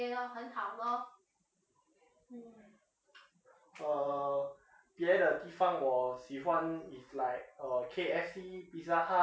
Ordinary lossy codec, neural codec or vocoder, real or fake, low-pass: none; none; real; none